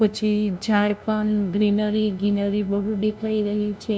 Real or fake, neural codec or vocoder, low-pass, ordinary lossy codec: fake; codec, 16 kHz, 0.5 kbps, FunCodec, trained on LibriTTS, 25 frames a second; none; none